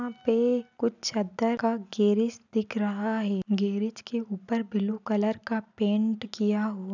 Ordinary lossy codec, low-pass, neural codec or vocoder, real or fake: none; 7.2 kHz; none; real